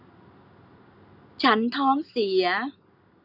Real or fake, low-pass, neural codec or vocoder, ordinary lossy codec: real; 5.4 kHz; none; none